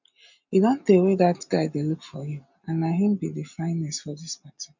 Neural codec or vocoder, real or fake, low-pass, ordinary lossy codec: none; real; 7.2 kHz; none